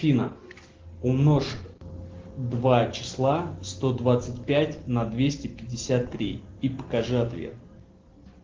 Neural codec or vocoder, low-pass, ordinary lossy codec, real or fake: none; 7.2 kHz; Opus, 16 kbps; real